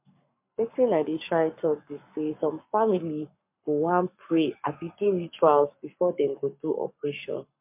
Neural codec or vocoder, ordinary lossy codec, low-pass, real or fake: codec, 44.1 kHz, 7.8 kbps, Pupu-Codec; MP3, 32 kbps; 3.6 kHz; fake